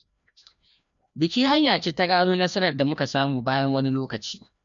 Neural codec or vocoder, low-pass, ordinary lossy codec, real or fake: codec, 16 kHz, 1 kbps, FreqCodec, larger model; 7.2 kHz; MP3, 64 kbps; fake